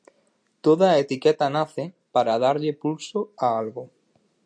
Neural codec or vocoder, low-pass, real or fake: none; 9.9 kHz; real